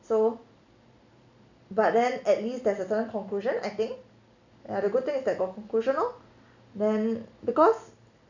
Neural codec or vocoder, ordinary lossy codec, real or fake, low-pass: none; none; real; 7.2 kHz